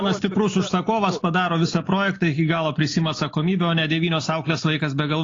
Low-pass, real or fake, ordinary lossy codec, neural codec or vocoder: 7.2 kHz; real; AAC, 32 kbps; none